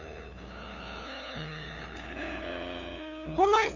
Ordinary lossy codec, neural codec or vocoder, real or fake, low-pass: none; codec, 16 kHz, 8 kbps, FunCodec, trained on LibriTTS, 25 frames a second; fake; 7.2 kHz